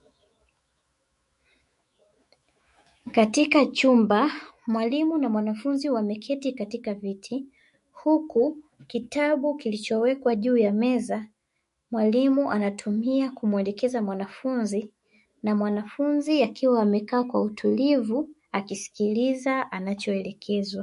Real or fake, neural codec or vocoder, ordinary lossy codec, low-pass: fake; autoencoder, 48 kHz, 128 numbers a frame, DAC-VAE, trained on Japanese speech; MP3, 48 kbps; 14.4 kHz